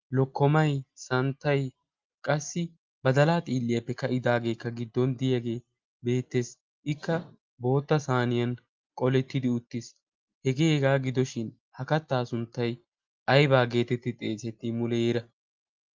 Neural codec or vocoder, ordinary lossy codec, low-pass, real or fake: none; Opus, 32 kbps; 7.2 kHz; real